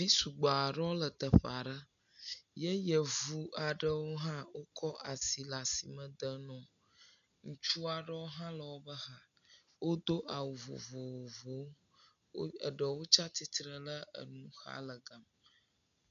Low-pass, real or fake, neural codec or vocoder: 7.2 kHz; real; none